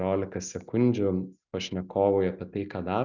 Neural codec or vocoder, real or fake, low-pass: none; real; 7.2 kHz